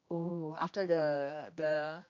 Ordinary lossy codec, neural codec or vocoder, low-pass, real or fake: AAC, 48 kbps; codec, 16 kHz, 1 kbps, X-Codec, HuBERT features, trained on general audio; 7.2 kHz; fake